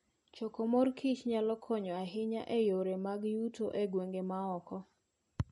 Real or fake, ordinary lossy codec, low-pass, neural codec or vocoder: real; MP3, 48 kbps; 19.8 kHz; none